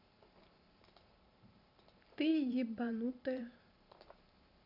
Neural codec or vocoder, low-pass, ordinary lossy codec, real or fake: vocoder, 44.1 kHz, 128 mel bands every 512 samples, BigVGAN v2; 5.4 kHz; none; fake